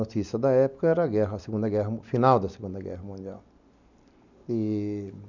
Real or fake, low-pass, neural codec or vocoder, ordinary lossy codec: real; 7.2 kHz; none; none